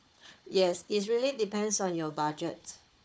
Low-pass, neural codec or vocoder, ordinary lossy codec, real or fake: none; codec, 16 kHz, 4 kbps, FunCodec, trained on Chinese and English, 50 frames a second; none; fake